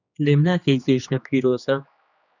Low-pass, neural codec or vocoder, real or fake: 7.2 kHz; codec, 16 kHz, 2 kbps, X-Codec, HuBERT features, trained on general audio; fake